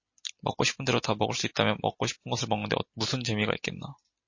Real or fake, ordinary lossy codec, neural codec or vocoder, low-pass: real; MP3, 32 kbps; none; 7.2 kHz